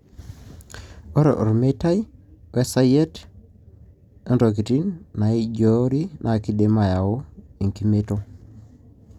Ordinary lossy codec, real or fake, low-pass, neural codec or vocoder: none; real; 19.8 kHz; none